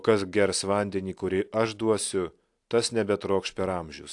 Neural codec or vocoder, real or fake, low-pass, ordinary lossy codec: none; real; 10.8 kHz; AAC, 64 kbps